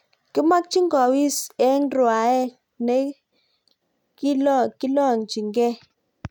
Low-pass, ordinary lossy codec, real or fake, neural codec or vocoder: 19.8 kHz; none; real; none